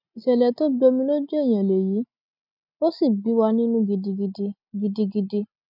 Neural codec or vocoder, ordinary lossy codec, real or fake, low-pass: none; none; real; 5.4 kHz